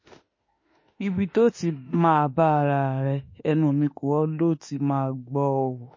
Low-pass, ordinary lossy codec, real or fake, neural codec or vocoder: 7.2 kHz; MP3, 32 kbps; fake; autoencoder, 48 kHz, 32 numbers a frame, DAC-VAE, trained on Japanese speech